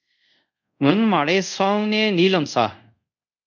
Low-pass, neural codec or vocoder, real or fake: 7.2 kHz; codec, 24 kHz, 0.5 kbps, DualCodec; fake